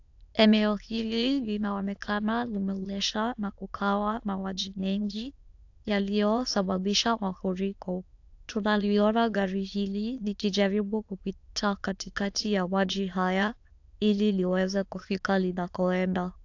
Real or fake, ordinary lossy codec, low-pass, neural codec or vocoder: fake; AAC, 48 kbps; 7.2 kHz; autoencoder, 22.05 kHz, a latent of 192 numbers a frame, VITS, trained on many speakers